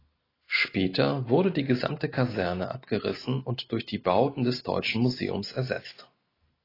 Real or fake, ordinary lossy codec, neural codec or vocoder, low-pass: real; AAC, 24 kbps; none; 5.4 kHz